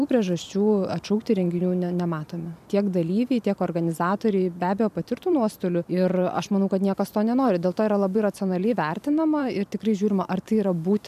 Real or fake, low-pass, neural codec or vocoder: fake; 14.4 kHz; vocoder, 44.1 kHz, 128 mel bands every 512 samples, BigVGAN v2